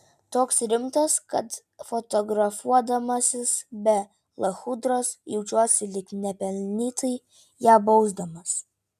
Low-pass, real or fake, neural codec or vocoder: 14.4 kHz; real; none